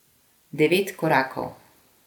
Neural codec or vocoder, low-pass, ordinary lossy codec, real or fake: none; 19.8 kHz; none; real